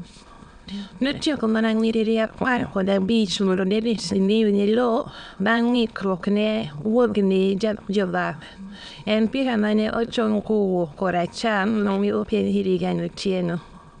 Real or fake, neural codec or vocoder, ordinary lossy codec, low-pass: fake; autoencoder, 22.05 kHz, a latent of 192 numbers a frame, VITS, trained on many speakers; none; 9.9 kHz